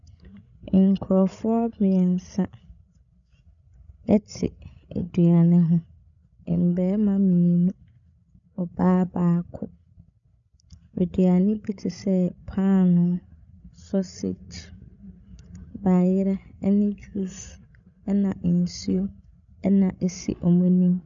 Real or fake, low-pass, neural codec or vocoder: fake; 7.2 kHz; codec, 16 kHz, 16 kbps, FreqCodec, larger model